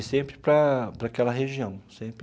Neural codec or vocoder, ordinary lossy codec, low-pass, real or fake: none; none; none; real